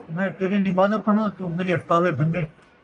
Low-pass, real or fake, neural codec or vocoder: 10.8 kHz; fake; codec, 44.1 kHz, 1.7 kbps, Pupu-Codec